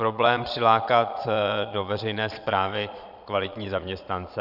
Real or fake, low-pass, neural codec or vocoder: fake; 5.4 kHz; vocoder, 22.05 kHz, 80 mel bands, Vocos